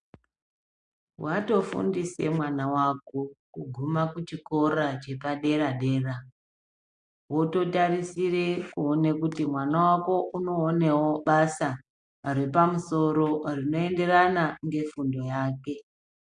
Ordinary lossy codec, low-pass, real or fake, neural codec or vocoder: MP3, 64 kbps; 9.9 kHz; real; none